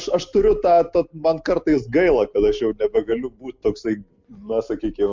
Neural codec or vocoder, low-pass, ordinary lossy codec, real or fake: none; 7.2 kHz; MP3, 64 kbps; real